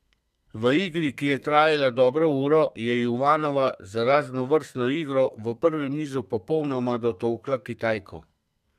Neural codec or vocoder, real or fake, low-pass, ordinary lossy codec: codec, 32 kHz, 1.9 kbps, SNAC; fake; 14.4 kHz; none